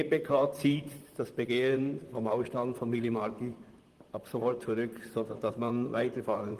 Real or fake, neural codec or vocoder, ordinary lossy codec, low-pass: fake; vocoder, 44.1 kHz, 128 mel bands, Pupu-Vocoder; Opus, 24 kbps; 14.4 kHz